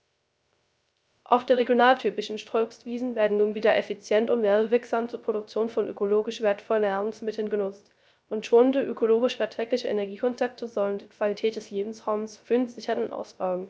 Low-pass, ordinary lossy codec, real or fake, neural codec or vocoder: none; none; fake; codec, 16 kHz, 0.3 kbps, FocalCodec